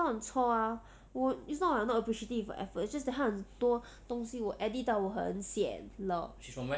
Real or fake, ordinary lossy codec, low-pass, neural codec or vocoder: real; none; none; none